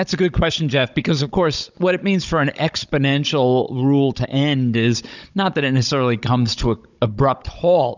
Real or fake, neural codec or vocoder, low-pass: fake; codec, 16 kHz, 16 kbps, FunCodec, trained on Chinese and English, 50 frames a second; 7.2 kHz